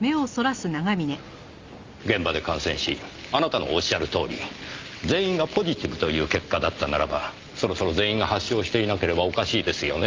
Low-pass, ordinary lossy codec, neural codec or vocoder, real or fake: 7.2 kHz; Opus, 32 kbps; none; real